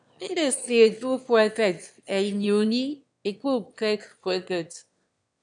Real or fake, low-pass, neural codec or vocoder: fake; 9.9 kHz; autoencoder, 22.05 kHz, a latent of 192 numbers a frame, VITS, trained on one speaker